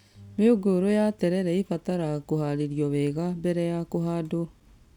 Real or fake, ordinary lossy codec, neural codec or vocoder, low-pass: real; none; none; 19.8 kHz